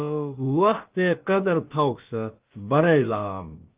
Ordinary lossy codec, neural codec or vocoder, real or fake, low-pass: Opus, 24 kbps; codec, 16 kHz, about 1 kbps, DyCAST, with the encoder's durations; fake; 3.6 kHz